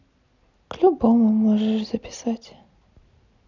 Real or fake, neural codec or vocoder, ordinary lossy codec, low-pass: real; none; none; 7.2 kHz